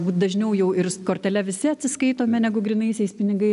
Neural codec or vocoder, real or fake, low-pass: none; real; 10.8 kHz